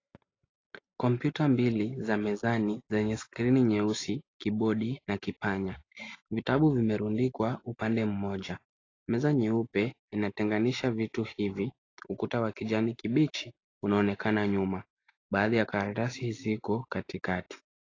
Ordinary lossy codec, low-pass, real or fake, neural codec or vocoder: AAC, 32 kbps; 7.2 kHz; real; none